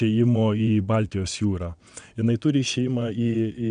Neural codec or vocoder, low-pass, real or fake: vocoder, 22.05 kHz, 80 mel bands, WaveNeXt; 9.9 kHz; fake